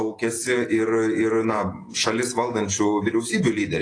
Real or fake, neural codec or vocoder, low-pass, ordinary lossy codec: fake; vocoder, 48 kHz, 128 mel bands, Vocos; 9.9 kHz; AAC, 32 kbps